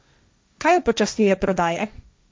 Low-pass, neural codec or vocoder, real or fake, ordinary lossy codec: none; codec, 16 kHz, 1.1 kbps, Voila-Tokenizer; fake; none